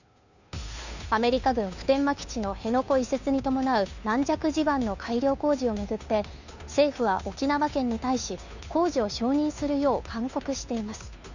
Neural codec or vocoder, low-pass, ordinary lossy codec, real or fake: codec, 16 kHz, 2 kbps, FunCodec, trained on Chinese and English, 25 frames a second; 7.2 kHz; none; fake